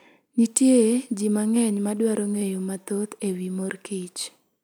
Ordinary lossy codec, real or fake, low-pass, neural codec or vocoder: none; real; none; none